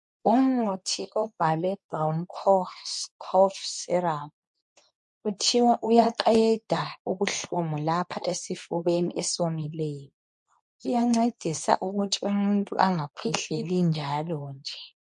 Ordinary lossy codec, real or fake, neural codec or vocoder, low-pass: MP3, 48 kbps; fake; codec, 24 kHz, 0.9 kbps, WavTokenizer, medium speech release version 2; 10.8 kHz